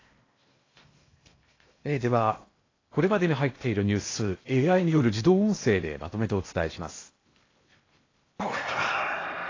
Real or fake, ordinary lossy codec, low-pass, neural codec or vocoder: fake; AAC, 32 kbps; 7.2 kHz; codec, 16 kHz in and 24 kHz out, 0.8 kbps, FocalCodec, streaming, 65536 codes